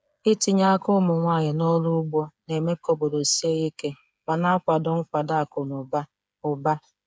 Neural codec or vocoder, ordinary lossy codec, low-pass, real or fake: codec, 16 kHz, 8 kbps, FreqCodec, smaller model; none; none; fake